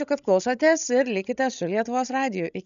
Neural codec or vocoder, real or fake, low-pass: codec, 16 kHz, 16 kbps, FreqCodec, larger model; fake; 7.2 kHz